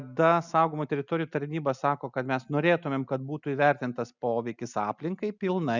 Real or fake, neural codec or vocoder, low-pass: real; none; 7.2 kHz